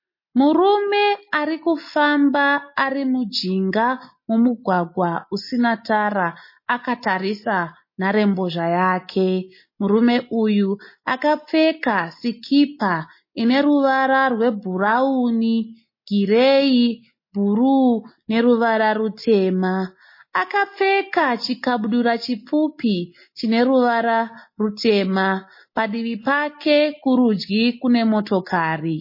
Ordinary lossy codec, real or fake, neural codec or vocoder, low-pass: MP3, 24 kbps; real; none; 5.4 kHz